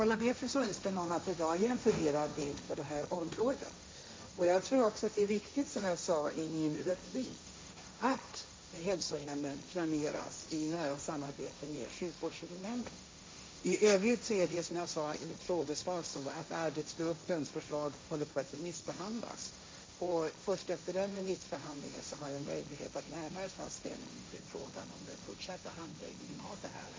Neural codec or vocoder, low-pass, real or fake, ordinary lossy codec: codec, 16 kHz, 1.1 kbps, Voila-Tokenizer; none; fake; none